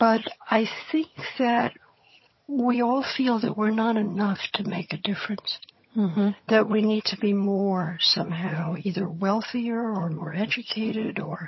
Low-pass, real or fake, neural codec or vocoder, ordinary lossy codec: 7.2 kHz; fake; vocoder, 22.05 kHz, 80 mel bands, HiFi-GAN; MP3, 24 kbps